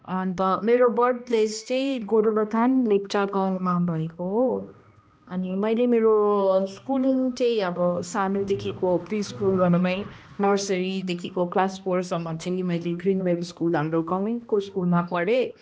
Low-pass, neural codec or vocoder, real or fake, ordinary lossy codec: none; codec, 16 kHz, 1 kbps, X-Codec, HuBERT features, trained on balanced general audio; fake; none